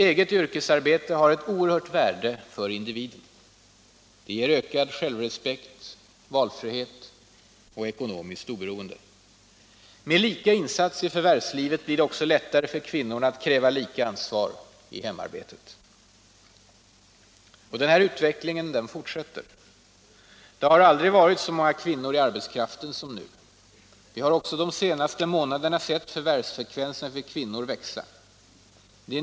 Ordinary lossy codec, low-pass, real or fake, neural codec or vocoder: none; none; real; none